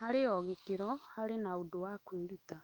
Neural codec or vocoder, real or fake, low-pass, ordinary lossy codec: autoencoder, 48 kHz, 128 numbers a frame, DAC-VAE, trained on Japanese speech; fake; 19.8 kHz; Opus, 32 kbps